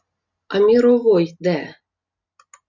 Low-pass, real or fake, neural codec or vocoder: 7.2 kHz; real; none